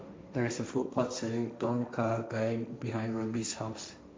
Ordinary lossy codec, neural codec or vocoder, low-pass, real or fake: none; codec, 16 kHz, 1.1 kbps, Voila-Tokenizer; none; fake